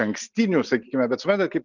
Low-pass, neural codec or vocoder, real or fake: 7.2 kHz; none; real